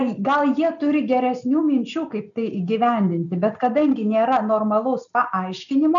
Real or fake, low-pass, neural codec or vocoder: real; 7.2 kHz; none